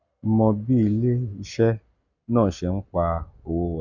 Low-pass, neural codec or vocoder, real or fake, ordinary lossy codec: 7.2 kHz; none; real; none